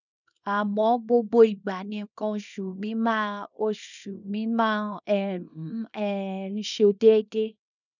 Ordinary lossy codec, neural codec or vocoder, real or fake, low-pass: none; codec, 24 kHz, 0.9 kbps, WavTokenizer, small release; fake; 7.2 kHz